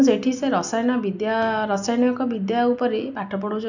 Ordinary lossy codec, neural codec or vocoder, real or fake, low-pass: none; none; real; 7.2 kHz